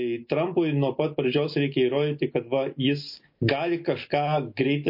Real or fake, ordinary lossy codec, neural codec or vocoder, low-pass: real; MP3, 32 kbps; none; 5.4 kHz